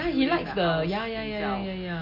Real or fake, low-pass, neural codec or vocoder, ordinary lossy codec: real; 5.4 kHz; none; MP3, 32 kbps